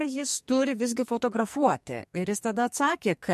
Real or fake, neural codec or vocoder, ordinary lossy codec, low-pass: fake; codec, 44.1 kHz, 2.6 kbps, SNAC; MP3, 64 kbps; 14.4 kHz